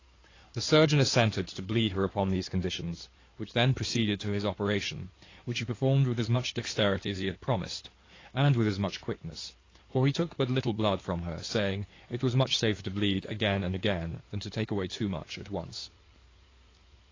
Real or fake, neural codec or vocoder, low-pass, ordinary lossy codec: fake; codec, 16 kHz in and 24 kHz out, 2.2 kbps, FireRedTTS-2 codec; 7.2 kHz; AAC, 32 kbps